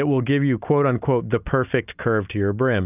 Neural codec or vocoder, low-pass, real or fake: none; 3.6 kHz; real